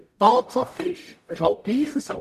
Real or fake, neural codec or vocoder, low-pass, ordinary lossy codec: fake; codec, 44.1 kHz, 0.9 kbps, DAC; 14.4 kHz; none